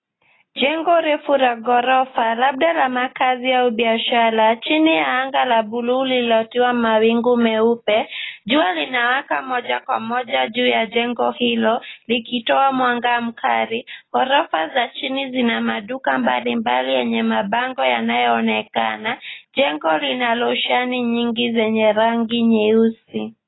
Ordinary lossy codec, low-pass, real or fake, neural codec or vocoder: AAC, 16 kbps; 7.2 kHz; real; none